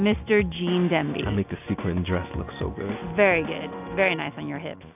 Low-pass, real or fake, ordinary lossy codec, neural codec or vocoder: 3.6 kHz; real; AAC, 32 kbps; none